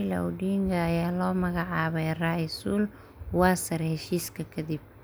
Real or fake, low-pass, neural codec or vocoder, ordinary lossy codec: real; none; none; none